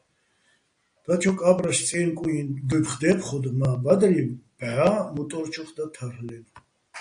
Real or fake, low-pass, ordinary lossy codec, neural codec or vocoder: real; 9.9 kHz; AAC, 64 kbps; none